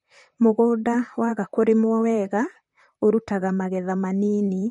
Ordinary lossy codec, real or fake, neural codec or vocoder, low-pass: MP3, 48 kbps; fake; vocoder, 44.1 kHz, 128 mel bands, Pupu-Vocoder; 19.8 kHz